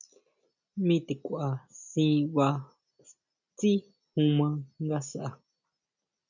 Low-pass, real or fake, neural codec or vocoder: 7.2 kHz; real; none